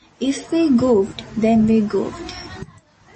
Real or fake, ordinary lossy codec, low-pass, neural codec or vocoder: real; MP3, 32 kbps; 10.8 kHz; none